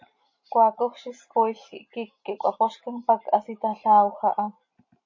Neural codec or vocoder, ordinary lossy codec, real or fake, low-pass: none; MP3, 32 kbps; real; 7.2 kHz